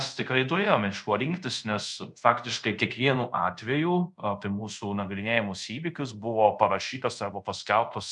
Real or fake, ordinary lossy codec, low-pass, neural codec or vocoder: fake; MP3, 96 kbps; 10.8 kHz; codec, 24 kHz, 0.5 kbps, DualCodec